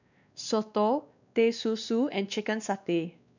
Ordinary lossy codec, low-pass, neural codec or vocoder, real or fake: none; 7.2 kHz; codec, 16 kHz, 2 kbps, X-Codec, WavLM features, trained on Multilingual LibriSpeech; fake